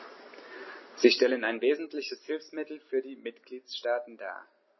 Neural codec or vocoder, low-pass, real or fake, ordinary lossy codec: none; 7.2 kHz; real; MP3, 24 kbps